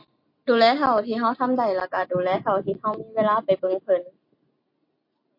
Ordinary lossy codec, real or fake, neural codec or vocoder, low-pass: MP3, 32 kbps; real; none; 5.4 kHz